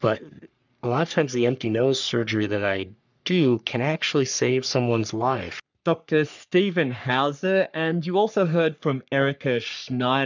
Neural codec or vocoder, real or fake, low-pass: codec, 44.1 kHz, 3.4 kbps, Pupu-Codec; fake; 7.2 kHz